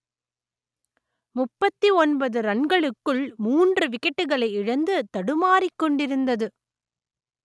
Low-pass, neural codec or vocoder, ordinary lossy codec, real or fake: none; none; none; real